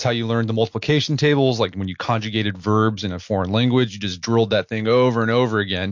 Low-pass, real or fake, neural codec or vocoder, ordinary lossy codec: 7.2 kHz; real; none; MP3, 48 kbps